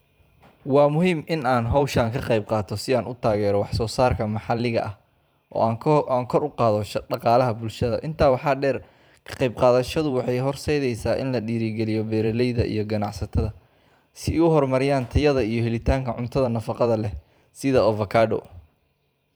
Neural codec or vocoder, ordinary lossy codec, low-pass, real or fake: vocoder, 44.1 kHz, 128 mel bands every 512 samples, BigVGAN v2; none; none; fake